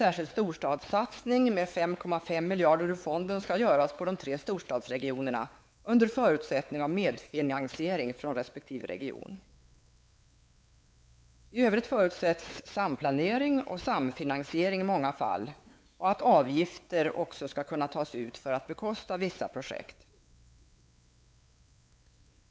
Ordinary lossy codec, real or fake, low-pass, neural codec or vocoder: none; fake; none; codec, 16 kHz, 4 kbps, X-Codec, WavLM features, trained on Multilingual LibriSpeech